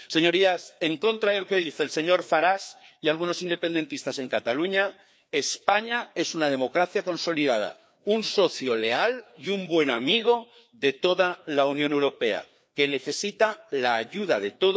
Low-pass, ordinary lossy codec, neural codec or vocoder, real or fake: none; none; codec, 16 kHz, 2 kbps, FreqCodec, larger model; fake